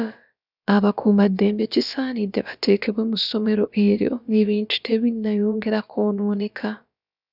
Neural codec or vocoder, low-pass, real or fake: codec, 16 kHz, about 1 kbps, DyCAST, with the encoder's durations; 5.4 kHz; fake